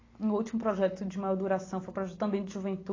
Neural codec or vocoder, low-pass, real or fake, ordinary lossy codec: none; 7.2 kHz; real; AAC, 32 kbps